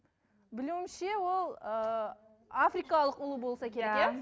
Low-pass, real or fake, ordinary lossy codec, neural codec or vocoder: none; real; none; none